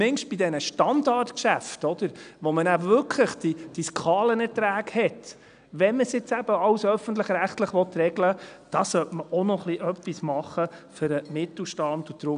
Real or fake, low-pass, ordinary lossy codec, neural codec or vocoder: real; 9.9 kHz; none; none